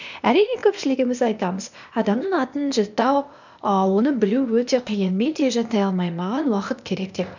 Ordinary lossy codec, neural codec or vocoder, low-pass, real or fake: none; codec, 16 kHz, 0.8 kbps, ZipCodec; 7.2 kHz; fake